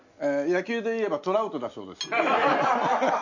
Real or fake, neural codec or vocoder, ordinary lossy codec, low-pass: real; none; none; 7.2 kHz